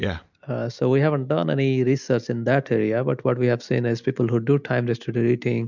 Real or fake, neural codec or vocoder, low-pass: real; none; 7.2 kHz